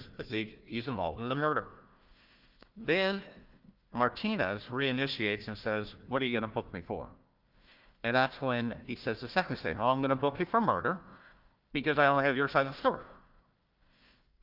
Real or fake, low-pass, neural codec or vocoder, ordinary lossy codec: fake; 5.4 kHz; codec, 16 kHz, 1 kbps, FunCodec, trained on Chinese and English, 50 frames a second; Opus, 32 kbps